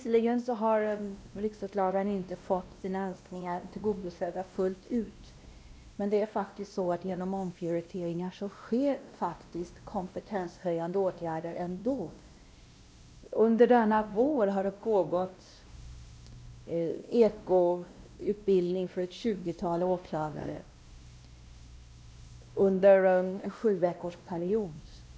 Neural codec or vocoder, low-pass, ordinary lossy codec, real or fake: codec, 16 kHz, 1 kbps, X-Codec, WavLM features, trained on Multilingual LibriSpeech; none; none; fake